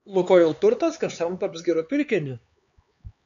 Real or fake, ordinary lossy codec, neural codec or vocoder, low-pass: fake; AAC, 96 kbps; codec, 16 kHz, 4 kbps, X-Codec, HuBERT features, trained on LibriSpeech; 7.2 kHz